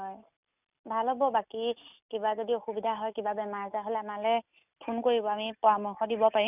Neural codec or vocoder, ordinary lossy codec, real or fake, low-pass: none; none; real; 3.6 kHz